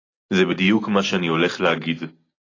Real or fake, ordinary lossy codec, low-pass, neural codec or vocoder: real; AAC, 32 kbps; 7.2 kHz; none